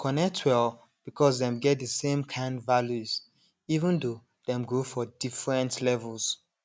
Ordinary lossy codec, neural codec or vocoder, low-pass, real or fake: none; none; none; real